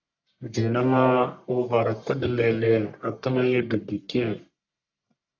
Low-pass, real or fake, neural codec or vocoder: 7.2 kHz; fake; codec, 44.1 kHz, 1.7 kbps, Pupu-Codec